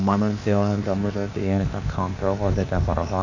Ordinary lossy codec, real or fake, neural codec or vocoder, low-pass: none; fake; codec, 16 kHz, 0.8 kbps, ZipCodec; 7.2 kHz